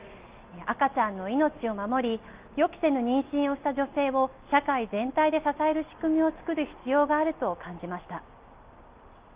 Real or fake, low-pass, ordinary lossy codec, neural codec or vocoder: real; 3.6 kHz; Opus, 32 kbps; none